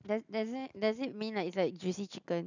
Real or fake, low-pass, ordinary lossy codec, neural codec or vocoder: real; 7.2 kHz; none; none